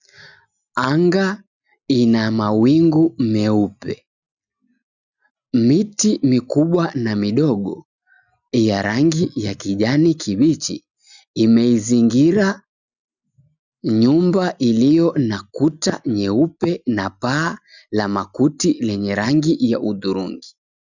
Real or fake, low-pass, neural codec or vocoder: real; 7.2 kHz; none